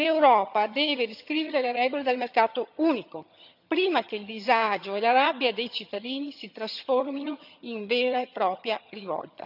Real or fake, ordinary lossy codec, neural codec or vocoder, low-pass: fake; none; vocoder, 22.05 kHz, 80 mel bands, HiFi-GAN; 5.4 kHz